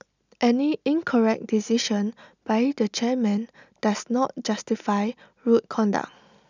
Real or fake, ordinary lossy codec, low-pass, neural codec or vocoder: real; none; 7.2 kHz; none